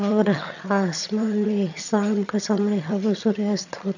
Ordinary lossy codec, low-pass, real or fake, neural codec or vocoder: none; 7.2 kHz; fake; vocoder, 22.05 kHz, 80 mel bands, HiFi-GAN